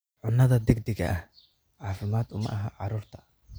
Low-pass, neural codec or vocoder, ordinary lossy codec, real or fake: none; none; none; real